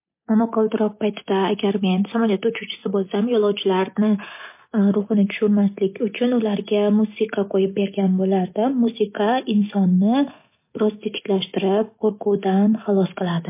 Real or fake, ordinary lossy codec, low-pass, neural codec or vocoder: real; MP3, 24 kbps; 3.6 kHz; none